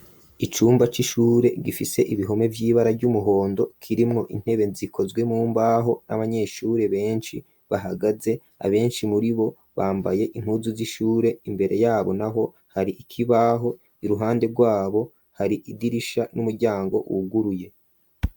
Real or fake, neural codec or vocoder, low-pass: real; none; 19.8 kHz